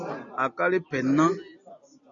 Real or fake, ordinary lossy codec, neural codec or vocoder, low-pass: real; MP3, 64 kbps; none; 7.2 kHz